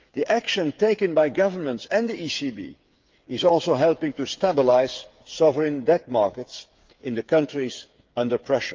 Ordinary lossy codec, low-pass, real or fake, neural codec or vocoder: Opus, 32 kbps; 7.2 kHz; fake; codec, 16 kHz, 8 kbps, FreqCodec, smaller model